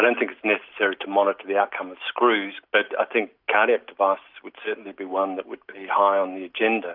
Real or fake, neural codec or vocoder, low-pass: real; none; 5.4 kHz